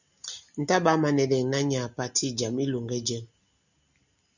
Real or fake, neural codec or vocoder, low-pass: real; none; 7.2 kHz